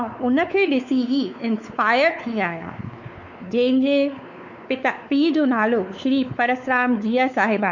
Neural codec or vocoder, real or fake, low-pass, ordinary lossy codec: codec, 16 kHz, 4 kbps, X-Codec, WavLM features, trained on Multilingual LibriSpeech; fake; 7.2 kHz; none